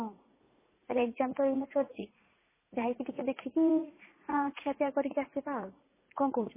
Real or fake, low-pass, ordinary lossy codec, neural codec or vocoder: fake; 3.6 kHz; MP3, 16 kbps; vocoder, 44.1 kHz, 80 mel bands, Vocos